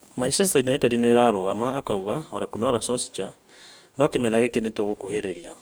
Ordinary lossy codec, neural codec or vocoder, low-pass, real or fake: none; codec, 44.1 kHz, 2.6 kbps, DAC; none; fake